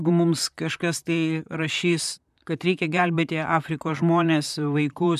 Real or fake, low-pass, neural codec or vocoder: fake; 14.4 kHz; vocoder, 44.1 kHz, 128 mel bands, Pupu-Vocoder